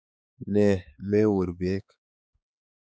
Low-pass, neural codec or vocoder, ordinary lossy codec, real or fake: none; codec, 16 kHz, 4 kbps, X-Codec, HuBERT features, trained on balanced general audio; none; fake